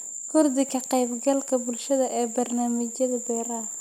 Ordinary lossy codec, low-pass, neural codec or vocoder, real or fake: none; 19.8 kHz; none; real